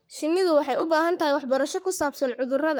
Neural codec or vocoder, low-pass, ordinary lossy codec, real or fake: codec, 44.1 kHz, 3.4 kbps, Pupu-Codec; none; none; fake